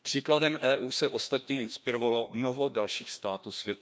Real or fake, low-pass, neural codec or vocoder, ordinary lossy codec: fake; none; codec, 16 kHz, 1 kbps, FreqCodec, larger model; none